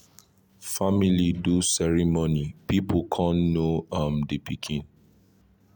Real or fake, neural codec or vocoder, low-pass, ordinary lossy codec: real; none; none; none